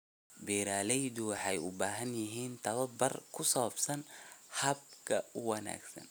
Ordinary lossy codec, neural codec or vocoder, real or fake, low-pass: none; none; real; none